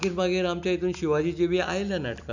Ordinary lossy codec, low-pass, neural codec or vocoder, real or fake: none; 7.2 kHz; none; real